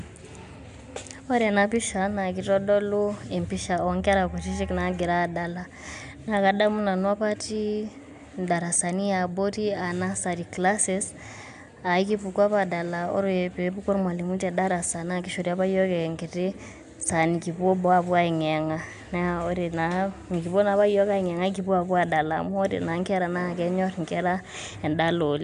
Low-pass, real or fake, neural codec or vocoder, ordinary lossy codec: 10.8 kHz; real; none; AAC, 96 kbps